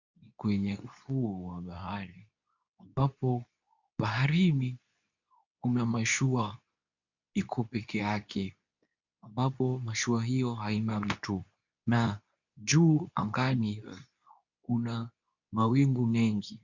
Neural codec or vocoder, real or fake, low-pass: codec, 24 kHz, 0.9 kbps, WavTokenizer, medium speech release version 2; fake; 7.2 kHz